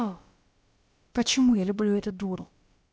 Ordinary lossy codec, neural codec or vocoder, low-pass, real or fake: none; codec, 16 kHz, about 1 kbps, DyCAST, with the encoder's durations; none; fake